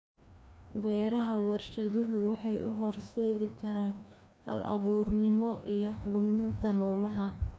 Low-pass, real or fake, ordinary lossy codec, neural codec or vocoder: none; fake; none; codec, 16 kHz, 1 kbps, FreqCodec, larger model